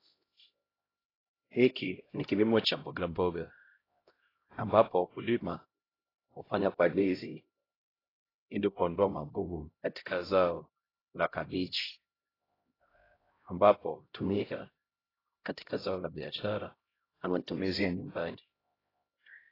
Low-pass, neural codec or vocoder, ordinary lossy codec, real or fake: 5.4 kHz; codec, 16 kHz, 0.5 kbps, X-Codec, HuBERT features, trained on LibriSpeech; AAC, 24 kbps; fake